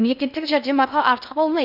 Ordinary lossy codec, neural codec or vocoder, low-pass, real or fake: none; codec, 16 kHz in and 24 kHz out, 0.6 kbps, FocalCodec, streaming, 2048 codes; 5.4 kHz; fake